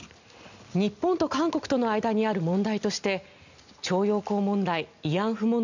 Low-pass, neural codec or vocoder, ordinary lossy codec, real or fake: 7.2 kHz; none; none; real